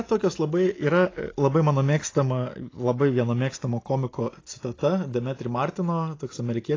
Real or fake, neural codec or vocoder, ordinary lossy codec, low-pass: real; none; AAC, 32 kbps; 7.2 kHz